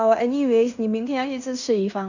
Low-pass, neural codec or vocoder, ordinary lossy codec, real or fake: 7.2 kHz; codec, 16 kHz in and 24 kHz out, 0.9 kbps, LongCat-Audio-Codec, fine tuned four codebook decoder; none; fake